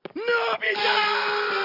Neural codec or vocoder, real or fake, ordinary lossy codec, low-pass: none; real; AAC, 48 kbps; 5.4 kHz